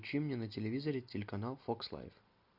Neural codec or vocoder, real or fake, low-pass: none; real; 5.4 kHz